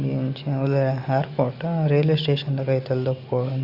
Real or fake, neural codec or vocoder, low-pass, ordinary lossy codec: real; none; 5.4 kHz; Opus, 64 kbps